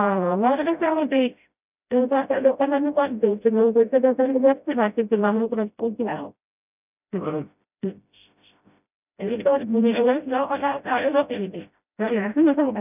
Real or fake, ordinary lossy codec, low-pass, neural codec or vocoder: fake; none; 3.6 kHz; codec, 16 kHz, 0.5 kbps, FreqCodec, smaller model